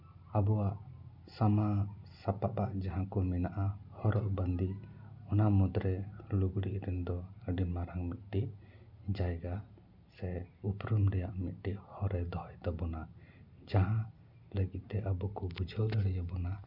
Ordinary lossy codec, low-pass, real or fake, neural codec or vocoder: none; 5.4 kHz; real; none